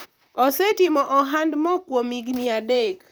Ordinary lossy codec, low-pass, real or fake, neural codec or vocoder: none; none; fake; vocoder, 44.1 kHz, 128 mel bands, Pupu-Vocoder